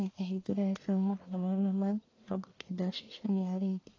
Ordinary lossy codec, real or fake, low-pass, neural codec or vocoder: AAC, 32 kbps; fake; 7.2 kHz; codec, 44.1 kHz, 1.7 kbps, Pupu-Codec